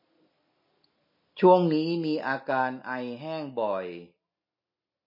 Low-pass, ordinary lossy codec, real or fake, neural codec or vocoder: 5.4 kHz; MP3, 24 kbps; real; none